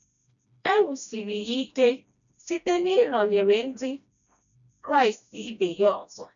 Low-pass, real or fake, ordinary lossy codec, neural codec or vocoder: 7.2 kHz; fake; none; codec, 16 kHz, 1 kbps, FreqCodec, smaller model